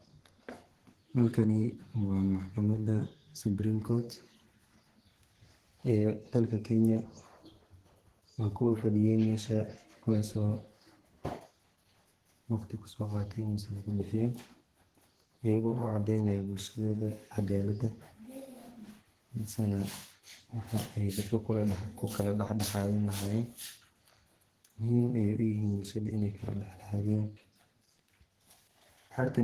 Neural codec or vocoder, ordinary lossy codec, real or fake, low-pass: codec, 32 kHz, 1.9 kbps, SNAC; Opus, 16 kbps; fake; 14.4 kHz